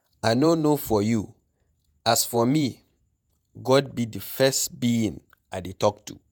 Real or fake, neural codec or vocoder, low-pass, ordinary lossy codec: fake; vocoder, 48 kHz, 128 mel bands, Vocos; none; none